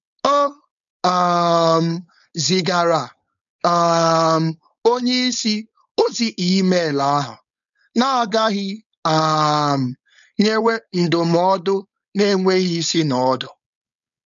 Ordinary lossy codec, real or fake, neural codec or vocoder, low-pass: none; fake; codec, 16 kHz, 4.8 kbps, FACodec; 7.2 kHz